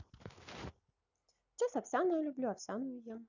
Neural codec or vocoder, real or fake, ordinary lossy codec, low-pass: none; real; none; 7.2 kHz